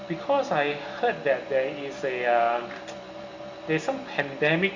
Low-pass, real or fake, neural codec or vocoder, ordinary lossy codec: 7.2 kHz; real; none; Opus, 64 kbps